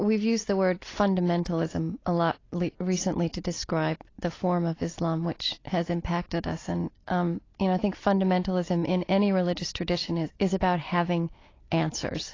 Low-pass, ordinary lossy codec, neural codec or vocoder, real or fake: 7.2 kHz; AAC, 32 kbps; none; real